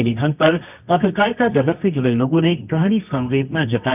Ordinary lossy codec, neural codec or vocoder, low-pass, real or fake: none; codec, 24 kHz, 0.9 kbps, WavTokenizer, medium music audio release; 3.6 kHz; fake